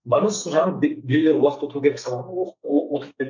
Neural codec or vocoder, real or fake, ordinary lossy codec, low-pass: codec, 32 kHz, 1.9 kbps, SNAC; fake; MP3, 64 kbps; 7.2 kHz